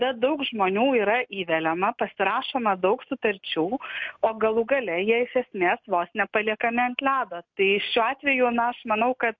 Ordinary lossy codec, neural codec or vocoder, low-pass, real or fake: MP3, 48 kbps; none; 7.2 kHz; real